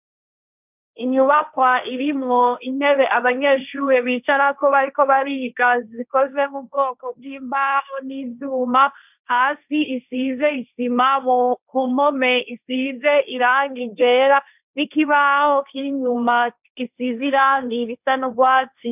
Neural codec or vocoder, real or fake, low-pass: codec, 16 kHz, 1.1 kbps, Voila-Tokenizer; fake; 3.6 kHz